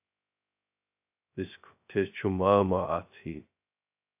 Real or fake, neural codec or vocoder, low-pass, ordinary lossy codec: fake; codec, 16 kHz, 0.2 kbps, FocalCodec; 3.6 kHz; AAC, 32 kbps